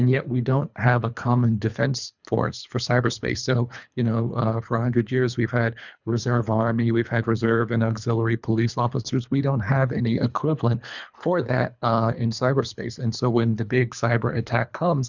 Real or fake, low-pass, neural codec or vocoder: fake; 7.2 kHz; codec, 24 kHz, 3 kbps, HILCodec